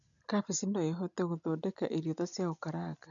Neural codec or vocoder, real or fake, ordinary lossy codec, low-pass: none; real; none; 7.2 kHz